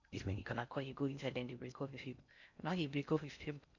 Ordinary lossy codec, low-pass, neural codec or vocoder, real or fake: none; 7.2 kHz; codec, 16 kHz in and 24 kHz out, 0.6 kbps, FocalCodec, streaming, 4096 codes; fake